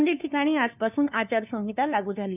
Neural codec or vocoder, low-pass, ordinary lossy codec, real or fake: codec, 16 kHz, 2 kbps, FunCodec, trained on LibriTTS, 25 frames a second; 3.6 kHz; none; fake